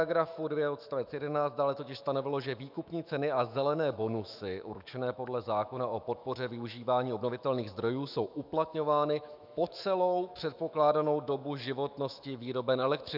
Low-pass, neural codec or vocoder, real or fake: 5.4 kHz; autoencoder, 48 kHz, 128 numbers a frame, DAC-VAE, trained on Japanese speech; fake